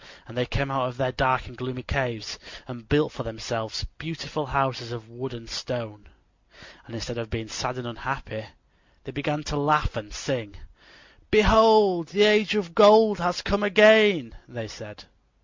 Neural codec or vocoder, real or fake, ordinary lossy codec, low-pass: none; real; MP3, 64 kbps; 7.2 kHz